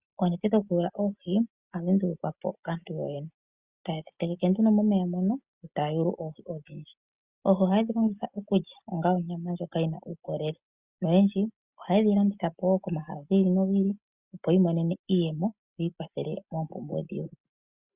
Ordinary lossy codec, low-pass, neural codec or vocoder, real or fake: Opus, 64 kbps; 3.6 kHz; none; real